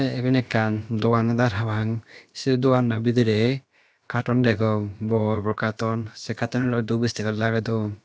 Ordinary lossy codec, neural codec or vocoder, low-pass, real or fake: none; codec, 16 kHz, about 1 kbps, DyCAST, with the encoder's durations; none; fake